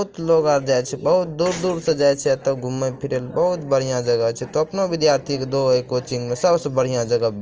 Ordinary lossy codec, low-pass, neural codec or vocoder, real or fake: Opus, 24 kbps; 7.2 kHz; none; real